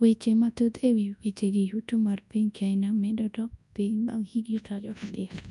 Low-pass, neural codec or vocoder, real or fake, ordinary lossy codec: 10.8 kHz; codec, 24 kHz, 0.9 kbps, WavTokenizer, large speech release; fake; none